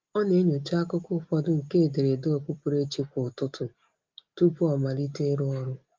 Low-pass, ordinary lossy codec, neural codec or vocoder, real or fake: 7.2 kHz; Opus, 32 kbps; none; real